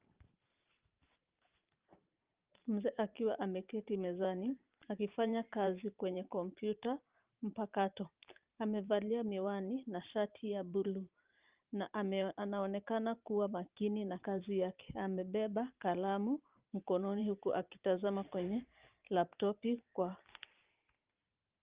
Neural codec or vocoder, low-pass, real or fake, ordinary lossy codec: vocoder, 44.1 kHz, 128 mel bands every 512 samples, BigVGAN v2; 3.6 kHz; fake; Opus, 24 kbps